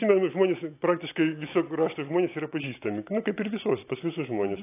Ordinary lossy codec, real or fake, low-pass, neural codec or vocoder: AAC, 24 kbps; real; 3.6 kHz; none